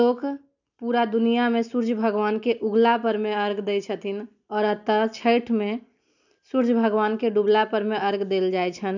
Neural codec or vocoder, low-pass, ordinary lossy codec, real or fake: none; 7.2 kHz; none; real